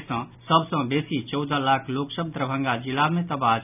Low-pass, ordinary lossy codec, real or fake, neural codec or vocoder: 3.6 kHz; none; real; none